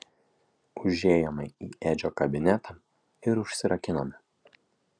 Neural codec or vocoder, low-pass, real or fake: none; 9.9 kHz; real